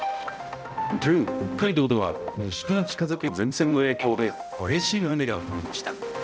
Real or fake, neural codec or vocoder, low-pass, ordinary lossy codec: fake; codec, 16 kHz, 0.5 kbps, X-Codec, HuBERT features, trained on balanced general audio; none; none